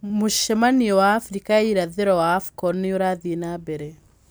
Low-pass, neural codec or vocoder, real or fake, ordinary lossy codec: none; none; real; none